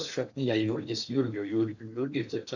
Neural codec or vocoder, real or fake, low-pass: codec, 16 kHz in and 24 kHz out, 0.8 kbps, FocalCodec, streaming, 65536 codes; fake; 7.2 kHz